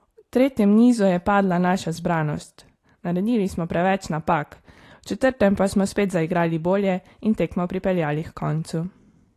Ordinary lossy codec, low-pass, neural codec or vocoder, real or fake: AAC, 48 kbps; 14.4 kHz; none; real